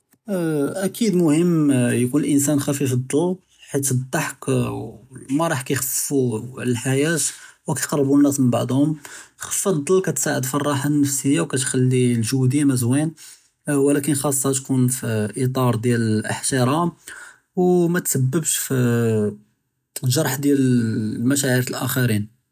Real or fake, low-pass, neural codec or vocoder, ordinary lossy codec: real; 14.4 kHz; none; none